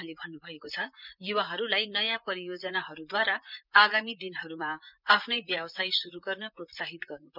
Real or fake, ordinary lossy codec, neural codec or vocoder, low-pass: fake; none; vocoder, 44.1 kHz, 128 mel bands, Pupu-Vocoder; 5.4 kHz